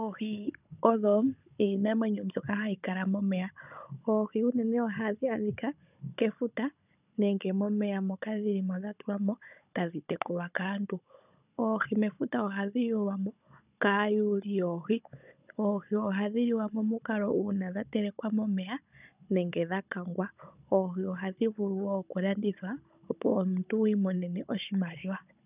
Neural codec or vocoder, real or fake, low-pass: codec, 16 kHz, 16 kbps, FunCodec, trained on Chinese and English, 50 frames a second; fake; 3.6 kHz